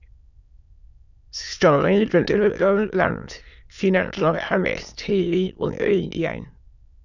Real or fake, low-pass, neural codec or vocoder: fake; 7.2 kHz; autoencoder, 22.05 kHz, a latent of 192 numbers a frame, VITS, trained on many speakers